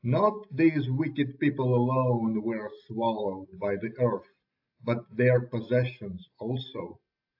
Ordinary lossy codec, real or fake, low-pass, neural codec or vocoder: AAC, 48 kbps; real; 5.4 kHz; none